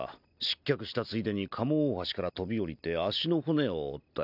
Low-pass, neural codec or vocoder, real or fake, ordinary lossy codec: 5.4 kHz; none; real; none